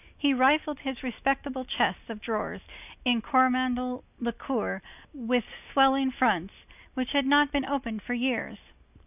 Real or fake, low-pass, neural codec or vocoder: real; 3.6 kHz; none